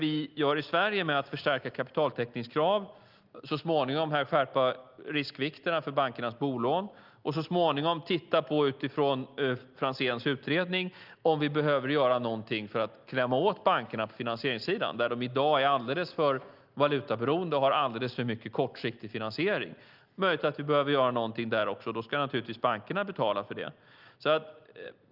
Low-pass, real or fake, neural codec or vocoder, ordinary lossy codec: 5.4 kHz; real; none; Opus, 24 kbps